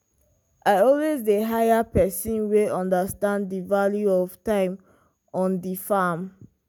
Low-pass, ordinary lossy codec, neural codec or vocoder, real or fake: none; none; none; real